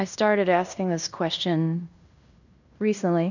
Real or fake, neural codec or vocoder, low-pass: fake; codec, 16 kHz in and 24 kHz out, 0.9 kbps, LongCat-Audio-Codec, fine tuned four codebook decoder; 7.2 kHz